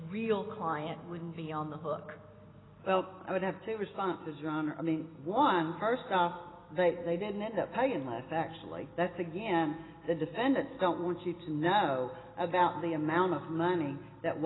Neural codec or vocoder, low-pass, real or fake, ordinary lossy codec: none; 7.2 kHz; real; AAC, 16 kbps